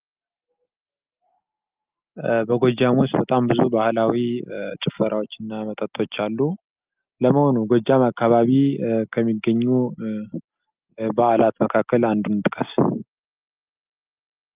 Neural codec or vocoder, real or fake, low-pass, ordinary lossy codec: none; real; 3.6 kHz; Opus, 32 kbps